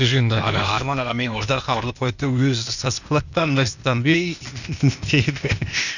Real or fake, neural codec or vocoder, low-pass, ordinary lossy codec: fake; codec, 16 kHz, 0.8 kbps, ZipCodec; 7.2 kHz; none